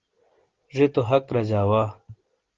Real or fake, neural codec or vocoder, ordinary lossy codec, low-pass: real; none; Opus, 16 kbps; 7.2 kHz